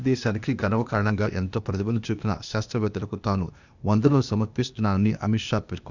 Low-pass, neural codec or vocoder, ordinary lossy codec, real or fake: 7.2 kHz; codec, 16 kHz, 0.8 kbps, ZipCodec; none; fake